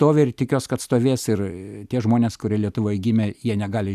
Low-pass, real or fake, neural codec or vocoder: 14.4 kHz; real; none